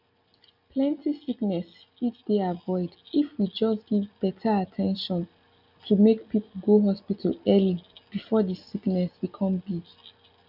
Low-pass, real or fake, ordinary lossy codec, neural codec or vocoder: 5.4 kHz; real; none; none